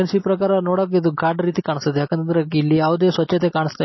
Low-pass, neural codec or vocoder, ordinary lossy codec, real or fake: 7.2 kHz; none; MP3, 24 kbps; real